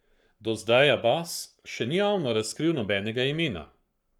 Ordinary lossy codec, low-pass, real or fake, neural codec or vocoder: none; 19.8 kHz; fake; codec, 44.1 kHz, 7.8 kbps, Pupu-Codec